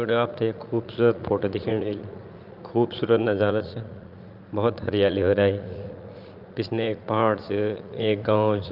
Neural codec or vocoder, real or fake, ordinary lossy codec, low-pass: vocoder, 44.1 kHz, 80 mel bands, Vocos; fake; Opus, 24 kbps; 5.4 kHz